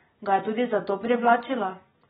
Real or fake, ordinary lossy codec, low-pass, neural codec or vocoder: real; AAC, 16 kbps; 7.2 kHz; none